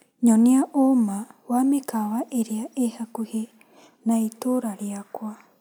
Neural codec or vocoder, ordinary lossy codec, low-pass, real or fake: none; none; none; real